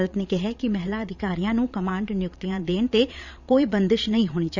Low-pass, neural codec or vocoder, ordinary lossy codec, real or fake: 7.2 kHz; vocoder, 22.05 kHz, 80 mel bands, Vocos; none; fake